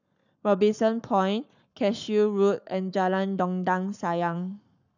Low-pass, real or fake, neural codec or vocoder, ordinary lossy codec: 7.2 kHz; fake; codec, 44.1 kHz, 7.8 kbps, Pupu-Codec; none